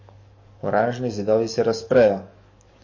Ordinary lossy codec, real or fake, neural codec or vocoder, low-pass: MP3, 32 kbps; fake; codec, 44.1 kHz, 7.8 kbps, DAC; 7.2 kHz